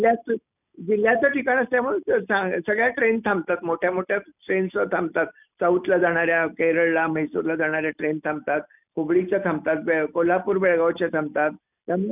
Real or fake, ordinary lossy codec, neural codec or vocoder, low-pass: real; none; none; 3.6 kHz